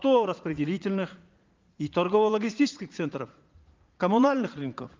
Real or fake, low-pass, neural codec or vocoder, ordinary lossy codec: fake; 7.2 kHz; vocoder, 44.1 kHz, 80 mel bands, Vocos; Opus, 32 kbps